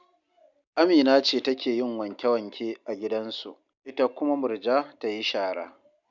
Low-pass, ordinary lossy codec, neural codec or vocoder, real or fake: 7.2 kHz; none; none; real